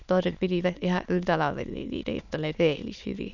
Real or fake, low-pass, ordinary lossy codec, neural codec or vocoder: fake; 7.2 kHz; none; autoencoder, 22.05 kHz, a latent of 192 numbers a frame, VITS, trained on many speakers